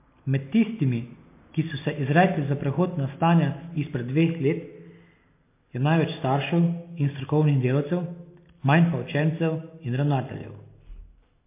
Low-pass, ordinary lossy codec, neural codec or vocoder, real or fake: 3.6 kHz; MP3, 24 kbps; none; real